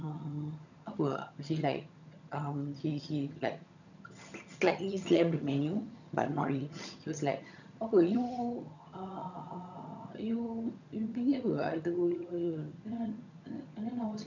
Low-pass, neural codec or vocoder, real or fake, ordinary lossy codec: 7.2 kHz; vocoder, 22.05 kHz, 80 mel bands, HiFi-GAN; fake; none